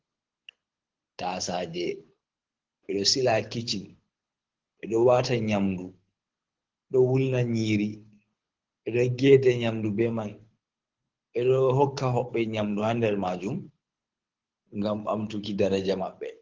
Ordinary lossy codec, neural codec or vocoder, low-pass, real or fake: Opus, 16 kbps; codec, 24 kHz, 6 kbps, HILCodec; 7.2 kHz; fake